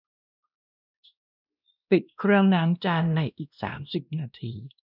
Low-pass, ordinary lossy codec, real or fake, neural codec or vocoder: 5.4 kHz; none; fake; codec, 16 kHz, 1 kbps, X-Codec, WavLM features, trained on Multilingual LibriSpeech